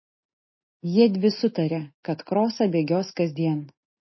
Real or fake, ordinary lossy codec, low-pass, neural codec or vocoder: real; MP3, 24 kbps; 7.2 kHz; none